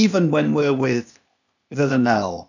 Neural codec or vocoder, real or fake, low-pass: codec, 16 kHz, 0.8 kbps, ZipCodec; fake; 7.2 kHz